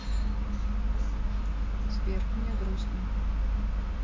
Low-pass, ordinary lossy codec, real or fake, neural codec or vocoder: 7.2 kHz; MP3, 64 kbps; real; none